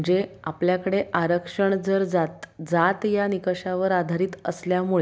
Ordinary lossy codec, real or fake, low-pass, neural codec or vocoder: none; real; none; none